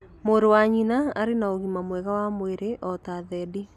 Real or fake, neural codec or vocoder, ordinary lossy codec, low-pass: real; none; none; 14.4 kHz